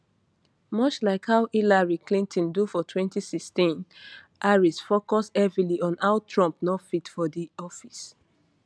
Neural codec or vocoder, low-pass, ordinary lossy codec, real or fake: none; none; none; real